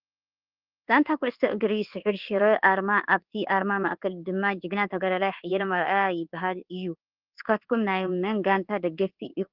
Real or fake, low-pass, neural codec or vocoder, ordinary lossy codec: fake; 5.4 kHz; codec, 24 kHz, 1.2 kbps, DualCodec; Opus, 16 kbps